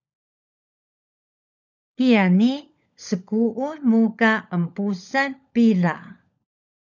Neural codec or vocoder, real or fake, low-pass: codec, 16 kHz, 16 kbps, FunCodec, trained on LibriTTS, 50 frames a second; fake; 7.2 kHz